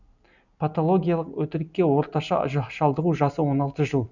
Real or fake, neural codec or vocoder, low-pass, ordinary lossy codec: real; none; 7.2 kHz; none